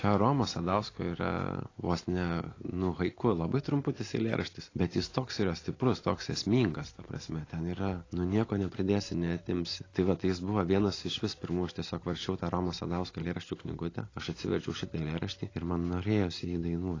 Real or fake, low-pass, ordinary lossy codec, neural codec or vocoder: real; 7.2 kHz; AAC, 32 kbps; none